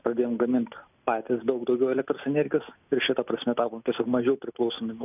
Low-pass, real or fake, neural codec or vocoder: 3.6 kHz; real; none